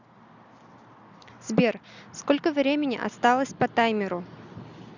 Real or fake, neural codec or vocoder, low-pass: real; none; 7.2 kHz